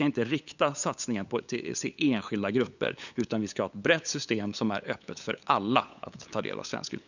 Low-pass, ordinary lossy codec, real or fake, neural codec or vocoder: 7.2 kHz; none; fake; codec, 16 kHz, 8 kbps, FunCodec, trained on LibriTTS, 25 frames a second